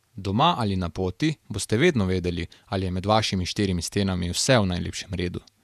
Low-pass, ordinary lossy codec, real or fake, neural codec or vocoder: 14.4 kHz; none; fake; vocoder, 48 kHz, 128 mel bands, Vocos